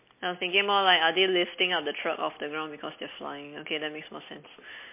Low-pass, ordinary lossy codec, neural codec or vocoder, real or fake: 3.6 kHz; MP3, 24 kbps; none; real